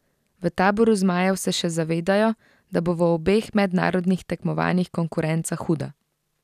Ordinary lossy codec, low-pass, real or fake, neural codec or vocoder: none; 14.4 kHz; real; none